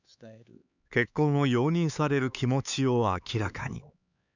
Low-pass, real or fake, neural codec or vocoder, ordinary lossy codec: 7.2 kHz; fake; codec, 16 kHz, 4 kbps, X-Codec, HuBERT features, trained on LibriSpeech; none